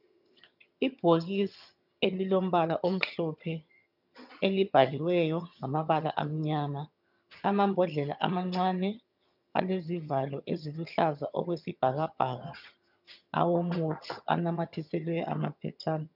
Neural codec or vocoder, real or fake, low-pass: vocoder, 22.05 kHz, 80 mel bands, HiFi-GAN; fake; 5.4 kHz